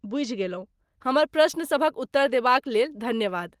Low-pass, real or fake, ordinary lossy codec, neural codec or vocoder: 14.4 kHz; real; Opus, 24 kbps; none